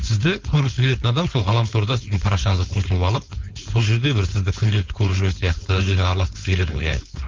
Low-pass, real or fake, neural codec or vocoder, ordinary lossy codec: 7.2 kHz; fake; codec, 16 kHz, 4.8 kbps, FACodec; Opus, 24 kbps